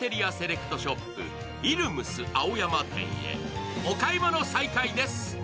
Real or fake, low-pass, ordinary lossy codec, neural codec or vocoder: real; none; none; none